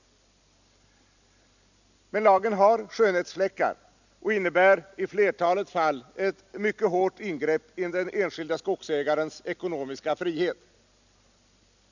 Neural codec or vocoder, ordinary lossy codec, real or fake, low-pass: none; none; real; 7.2 kHz